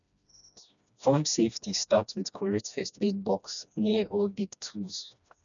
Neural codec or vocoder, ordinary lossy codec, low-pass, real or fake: codec, 16 kHz, 1 kbps, FreqCodec, smaller model; none; 7.2 kHz; fake